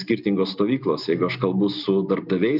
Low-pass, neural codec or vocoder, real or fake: 5.4 kHz; none; real